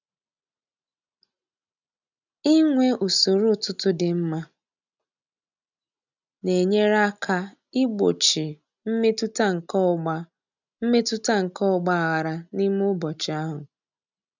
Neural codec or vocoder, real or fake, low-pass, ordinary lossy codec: none; real; 7.2 kHz; none